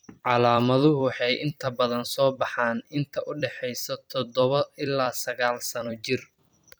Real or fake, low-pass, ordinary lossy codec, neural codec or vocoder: fake; none; none; vocoder, 44.1 kHz, 128 mel bands every 256 samples, BigVGAN v2